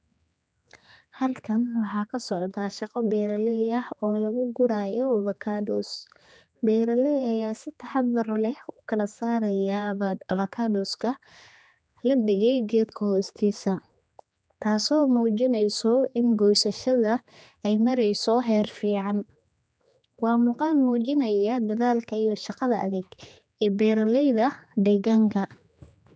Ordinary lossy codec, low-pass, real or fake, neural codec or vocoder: none; none; fake; codec, 16 kHz, 2 kbps, X-Codec, HuBERT features, trained on general audio